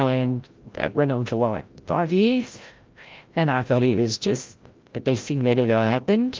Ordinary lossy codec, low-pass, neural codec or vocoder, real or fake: Opus, 24 kbps; 7.2 kHz; codec, 16 kHz, 0.5 kbps, FreqCodec, larger model; fake